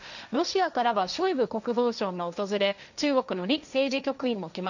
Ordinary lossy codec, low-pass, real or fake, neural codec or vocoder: none; 7.2 kHz; fake; codec, 16 kHz, 1.1 kbps, Voila-Tokenizer